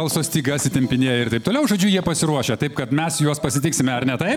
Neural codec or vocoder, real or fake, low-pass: none; real; 19.8 kHz